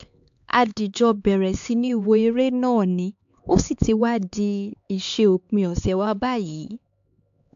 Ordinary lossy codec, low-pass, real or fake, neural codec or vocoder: AAC, 64 kbps; 7.2 kHz; fake; codec, 16 kHz, 4 kbps, X-Codec, HuBERT features, trained on LibriSpeech